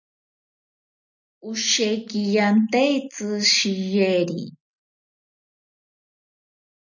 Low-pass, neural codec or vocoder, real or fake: 7.2 kHz; none; real